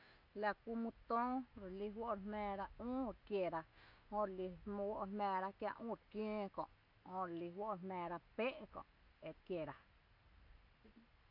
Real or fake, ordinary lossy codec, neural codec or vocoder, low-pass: real; none; none; 5.4 kHz